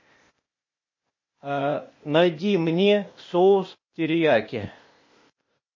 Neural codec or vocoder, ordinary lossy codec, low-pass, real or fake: codec, 16 kHz, 0.8 kbps, ZipCodec; MP3, 32 kbps; 7.2 kHz; fake